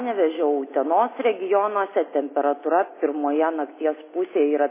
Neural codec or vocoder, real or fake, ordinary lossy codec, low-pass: none; real; MP3, 16 kbps; 3.6 kHz